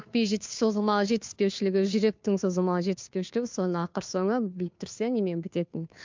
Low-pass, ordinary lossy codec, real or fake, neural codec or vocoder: 7.2 kHz; MP3, 64 kbps; fake; codec, 16 kHz, 2 kbps, FunCodec, trained on Chinese and English, 25 frames a second